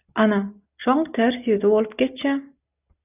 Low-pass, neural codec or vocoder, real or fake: 3.6 kHz; none; real